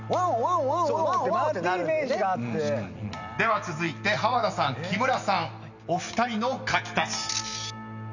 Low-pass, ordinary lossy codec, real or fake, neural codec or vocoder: 7.2 kHz; none; real; none